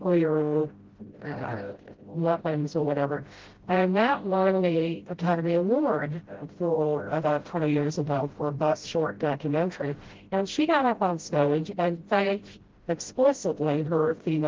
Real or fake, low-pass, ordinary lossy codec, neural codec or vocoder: fake; 7.2 kHz; Opus, 16 kbps; codec, 16 kHz, 0.5 kbps, FreqCodec, smaller model